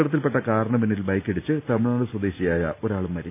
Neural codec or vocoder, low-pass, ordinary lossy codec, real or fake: none; 3.6 kHz; none; real